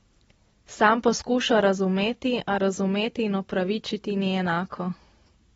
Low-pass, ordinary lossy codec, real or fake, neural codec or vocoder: 19.8 kHz; AAC, 24 kbps; real; none